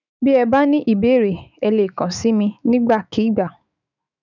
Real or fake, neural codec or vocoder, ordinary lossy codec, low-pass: fake; autoencoder, 48 kHz, 128 numbers a frame, DAC-VAE, trained on Japanese speech; none; 7.2 kHz